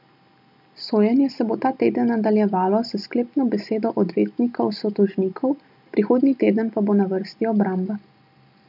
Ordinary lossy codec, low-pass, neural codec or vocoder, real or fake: none; 5.4 kHz; none; real